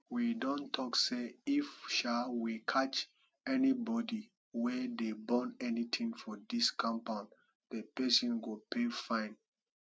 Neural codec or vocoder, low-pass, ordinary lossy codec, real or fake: none; none; none; real